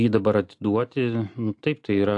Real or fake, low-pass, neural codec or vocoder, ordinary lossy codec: real; 10.8 kHz; none; AAC, 64 kbps